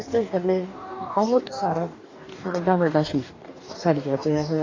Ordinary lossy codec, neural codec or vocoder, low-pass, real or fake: AAC, 32 kbps; codec, 44.1 kHz, 2.6 kbps, DAC; 7.2 kHz; fake